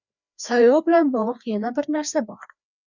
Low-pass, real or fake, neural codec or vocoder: 7.2 kHz; fake; codec, 16 kHz, 2 kbps, FreqCodec, larger model